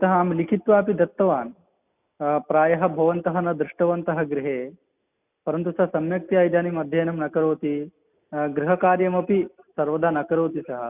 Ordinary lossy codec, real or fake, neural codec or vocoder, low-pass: none; real; none; 3.6 kHz